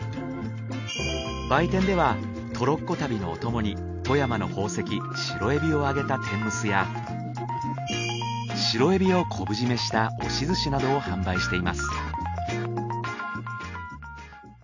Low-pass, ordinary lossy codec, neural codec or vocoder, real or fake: 7.2 kHz; none; none; real